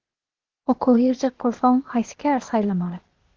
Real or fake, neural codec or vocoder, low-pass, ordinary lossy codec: fake; codec, 16 kHz, 0.8 kbps, ZipCodec; 7.2 kHz; Opus, 16 kbps